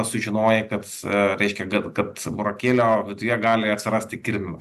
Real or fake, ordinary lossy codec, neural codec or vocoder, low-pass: real; AAC, 96 kbps; none; 14.4 kHz